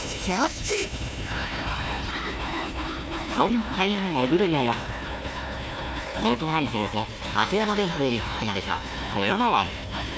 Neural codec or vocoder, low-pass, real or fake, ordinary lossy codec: codec, 16 kHz, 1 kbps, FunCodec, trained on Chinese and English, 50 frames a second; none; fake; none